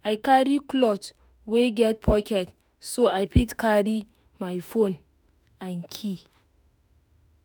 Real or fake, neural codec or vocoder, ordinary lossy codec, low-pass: fake; autoencoder, 48 kHz, 32 numbers a frame, DAC-VAE, trained on Japanese speech; none; none